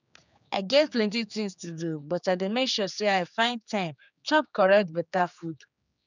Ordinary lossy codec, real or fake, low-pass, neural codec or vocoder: none; fake; 7.2 kHz; codec, 16 kHz, 4 kbps, X-Codec, HuBERT features, trained on general audio